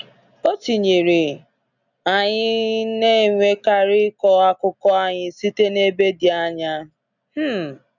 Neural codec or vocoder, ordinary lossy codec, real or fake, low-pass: none; none; real; 7.2 kHz